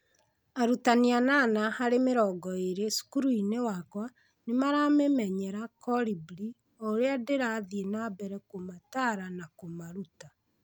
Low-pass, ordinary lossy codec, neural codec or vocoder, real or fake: none; none; none; real